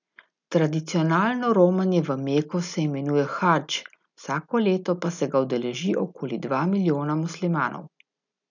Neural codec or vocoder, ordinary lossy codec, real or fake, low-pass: none; none; real; 7.2 kHz